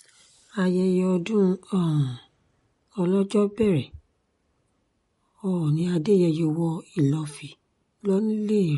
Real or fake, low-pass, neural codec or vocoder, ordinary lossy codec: real; 19.8 kHz; none; MP3, 48 kbps